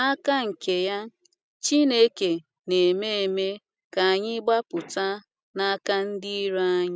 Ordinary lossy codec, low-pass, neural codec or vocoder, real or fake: none; none; none; real